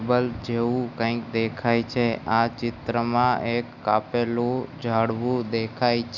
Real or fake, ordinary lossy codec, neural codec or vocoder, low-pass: real; none; none; 7.2 kHz